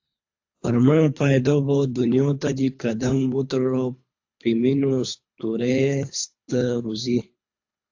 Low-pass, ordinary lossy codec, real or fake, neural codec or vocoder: 7.2 kHz; AAC, 48 kbps; fake; codec, 24 kHz, 3 kbps, HILCodec